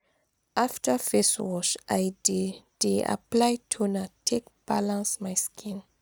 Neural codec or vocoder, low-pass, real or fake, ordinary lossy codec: none; none; real; none